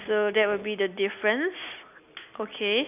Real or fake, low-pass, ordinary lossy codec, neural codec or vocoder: real; 3.6 kHz; none; none